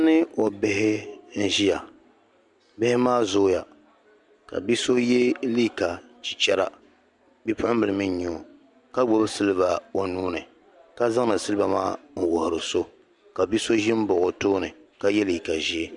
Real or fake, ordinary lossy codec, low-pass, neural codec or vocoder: real; MP3, 96 kbps; 10.8 kHz; none